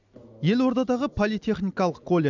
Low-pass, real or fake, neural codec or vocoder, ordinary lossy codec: 7.2 kHz; real; none; none